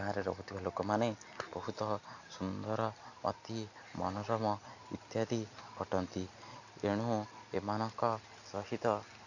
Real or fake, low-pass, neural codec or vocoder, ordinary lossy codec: real; 7.2 kHz; none; none